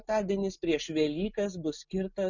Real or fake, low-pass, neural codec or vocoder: real; 7.2 kHz; none